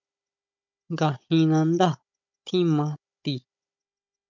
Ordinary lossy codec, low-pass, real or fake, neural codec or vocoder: MP3, 64 kbps; 7.2 kHz; fake; codec, 16 kHz, 16 kbps, FunCodec, trained on Chinese and English, 50 frames a second